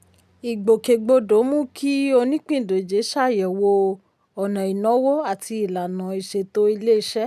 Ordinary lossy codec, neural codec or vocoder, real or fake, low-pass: none; none; real; 14.4 kHz